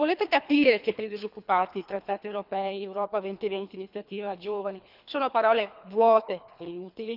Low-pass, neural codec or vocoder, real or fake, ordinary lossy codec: 5.4 kHz; codec, 24 kHz, 3 kbps, HILCodec; fake; none